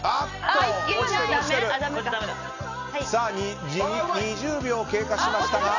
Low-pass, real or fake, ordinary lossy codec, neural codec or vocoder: 7.2 kHz; real; none; none